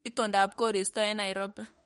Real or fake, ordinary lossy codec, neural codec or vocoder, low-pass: fake; MP3, 48 kbps; autoencoder, 48 kHz, 32 numbers a frame, DAC-VAE, trained on Japanese speech; 19.8 kHz